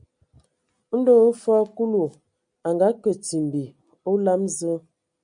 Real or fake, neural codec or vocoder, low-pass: real; none; 9.9 kHz